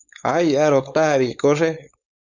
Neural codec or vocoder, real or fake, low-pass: codec, 16 kHz, 4.8 kbps, FACodec; fake; 7.2 kHz